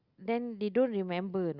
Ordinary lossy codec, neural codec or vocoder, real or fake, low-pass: Opus, 64 kbps; none; real; 5.4 kHz